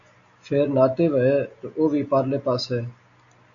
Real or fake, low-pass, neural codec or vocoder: real; 7.2 kHz; none